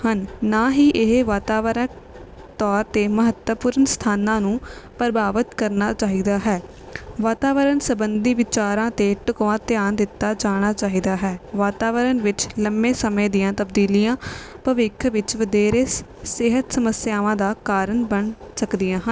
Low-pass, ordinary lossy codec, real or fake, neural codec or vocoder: none; none; real; none